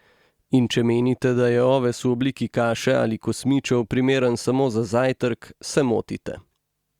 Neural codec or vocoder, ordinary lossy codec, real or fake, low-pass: none; Opus, 64 kbps; real; 19.8 kHz